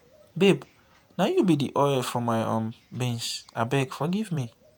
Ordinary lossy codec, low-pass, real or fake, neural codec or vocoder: none; none; fake; vocoder, 48 kHz, 128 mel bands, Vocos